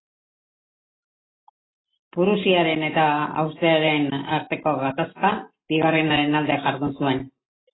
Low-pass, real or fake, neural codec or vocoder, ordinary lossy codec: 7.2 kHz; real; none; AAC, 16 kbps